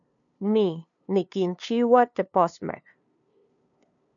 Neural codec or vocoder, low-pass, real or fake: codec, 16 kHz, 2 kbps, FunCodec, trained on LibriTTS, 25 frames a second; 7.2 kHz; fake